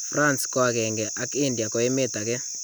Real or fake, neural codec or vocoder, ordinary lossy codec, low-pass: real; none; none; none